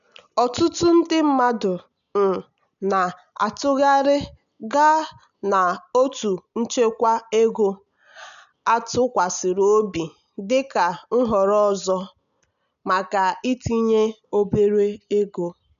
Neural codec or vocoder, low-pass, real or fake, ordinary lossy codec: none; 7.2 kHz; real; none